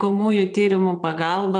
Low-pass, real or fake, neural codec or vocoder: 9.9 kHz; fake; vocoder, 22.05 kHz, 80 mel bands, Vocos